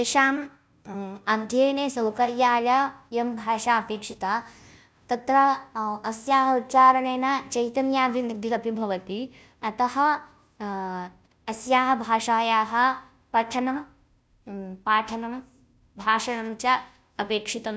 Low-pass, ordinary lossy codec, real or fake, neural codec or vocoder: none; none; fake; codec, 16 kHz, 0.5 kbps, FunCodec, trained on Chinese and English, 25 frames a second